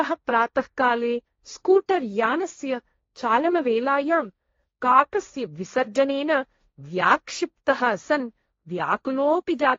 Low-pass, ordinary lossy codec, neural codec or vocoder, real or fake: 7.2 kHz; AAC, 32 kbps; codec, 16 kHz, 1.1 kbps, Voila-Tokenizer; fake